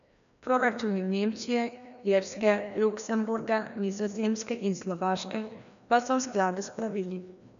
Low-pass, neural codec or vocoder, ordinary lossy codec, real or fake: 7.2 kHz; codec, 16 kHz, 1 kbps, FreqCodec, larger model; none; fake